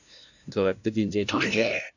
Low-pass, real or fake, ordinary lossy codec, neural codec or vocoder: 7.2 kHz; fake; none; codec, 16 kHz, 0.5 kbps, FunCodec, trained on LibriTTS, 25 frames a second